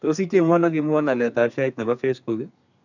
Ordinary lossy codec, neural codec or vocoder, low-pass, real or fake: none; codec, 32 kHz, 1.9 kbps, SNAC; 7.2 kHz; fake